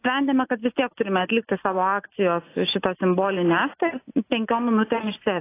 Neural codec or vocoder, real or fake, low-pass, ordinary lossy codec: none; real; 3.6 kHz; AAC, 24 kbps